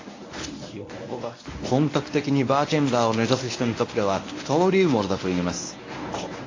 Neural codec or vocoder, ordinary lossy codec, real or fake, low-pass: codec, 24 kHz, 0.9 kbps, WavTokenizer, medium speech release version 1; AAC, 32 kbps; fake; 7.2 kHz